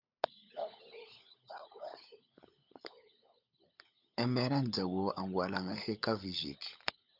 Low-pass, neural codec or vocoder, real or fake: 5.4 kHz; codec, 16 kHz, 16 kbps, FunCodec, trained on LibriTTS, 50 frames a second; fake